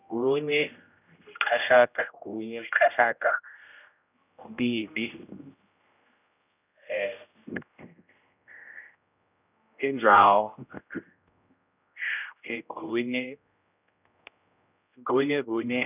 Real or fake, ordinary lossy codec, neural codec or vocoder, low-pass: fake; none; codec, 16 kHz, 0.5 kbps, X-Codec, HuBERT features, trained on general audio; 3.6 kHz